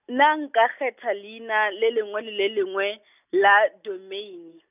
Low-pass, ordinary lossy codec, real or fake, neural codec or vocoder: 3.6 kHz; none; real; none